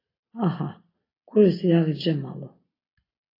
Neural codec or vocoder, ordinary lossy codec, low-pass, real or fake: none; AAC, 32 kbps; 5.4 kHz; real